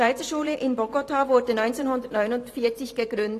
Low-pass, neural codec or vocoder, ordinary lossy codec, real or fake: 14.4 kHz; vocoder, 44.1 kHz, 128 mel bands every 512 samples, BigVGAN v2; AAC, 48 kbps; fake